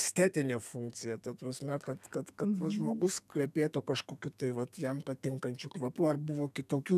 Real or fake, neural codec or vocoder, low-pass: fake; codec, 32 kHz, 1.9 kbps, SNAC; 14.4 kHz